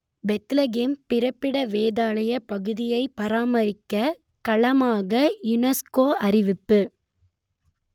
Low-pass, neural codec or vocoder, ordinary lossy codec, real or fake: 19.8 kHz; codec, 44.1 kHz, 7.8 kbps, Pupu-Codec; none; fake